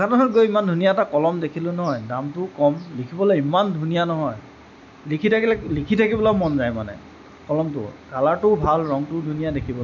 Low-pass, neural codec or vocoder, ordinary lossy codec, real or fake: 7.2 kHz; none; MP3, 64 kbps; real